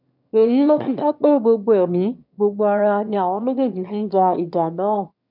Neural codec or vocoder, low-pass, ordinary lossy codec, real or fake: autoencoder, 22.05 kHz, a latent of 192 numbers a frame, VITS, trained on one speaker; 5.4 kHz; none; fake